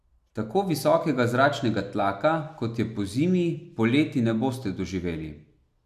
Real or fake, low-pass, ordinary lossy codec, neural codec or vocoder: real; 14.4 kHz; none; none